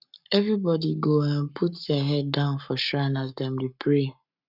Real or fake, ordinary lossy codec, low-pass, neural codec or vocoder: fake; none; 5.4 kHz; codec, 44.1 kHz, 7.8 kbps, Pupu-Codec